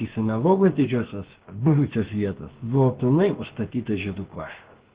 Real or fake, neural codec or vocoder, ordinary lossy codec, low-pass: fake; codec, 16 kHz, about 1 kbps, DyCAST, with the encoder's durations; Opus, 16 kbps; 3.6 kHz